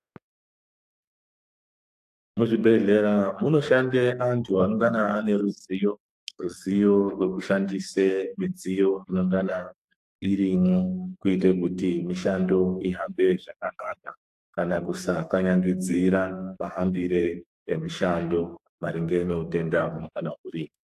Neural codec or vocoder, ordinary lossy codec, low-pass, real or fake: codec, 32 kHz, 1.9 kbps, SNAC; AAC, 64 kbps; 14.4 kHz; fake